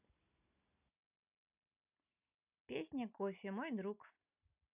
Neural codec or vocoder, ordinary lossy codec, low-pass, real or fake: none; none; 3.6 kHz; real